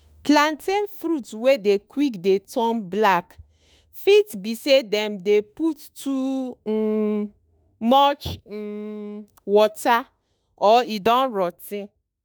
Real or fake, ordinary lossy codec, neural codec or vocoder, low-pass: fake; none; autoencoder, 48 kHz, 32 numbers a frame, DAC-VAE, trained on Japanese speech; none